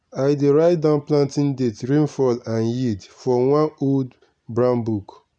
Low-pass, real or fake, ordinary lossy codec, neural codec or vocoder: 9.9 kHz; real; none; none